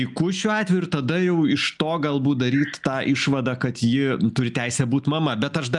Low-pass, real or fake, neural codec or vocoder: 10.8 kHz; real; none